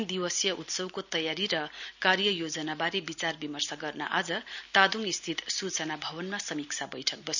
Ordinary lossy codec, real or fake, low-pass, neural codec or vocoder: none; real; 7.2 kHz; none